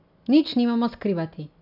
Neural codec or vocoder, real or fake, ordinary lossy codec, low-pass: none; real; none; 5.4 kHz